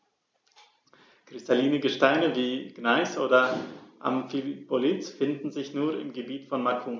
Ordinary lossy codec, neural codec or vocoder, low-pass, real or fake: none; none; none; real